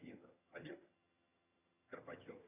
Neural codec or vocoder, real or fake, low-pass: vocoder, 22.05 kHz, 80 mel bands, HiFi-GAN; fake; 3.6 kHz